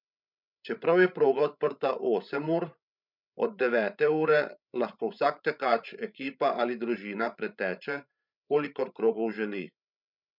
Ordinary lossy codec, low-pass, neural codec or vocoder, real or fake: none; 5.4 kHz; codec, 16 kHz, 16 kbps, FreqCodec, larger model; fake